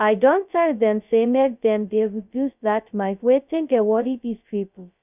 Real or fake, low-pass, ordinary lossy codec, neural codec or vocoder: fake; 3.6 kHz; none; codec, 16 kHz, 0.2 kbps, FocalCodec